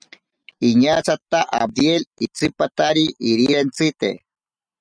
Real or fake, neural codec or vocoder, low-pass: real; none; 9.9 kHz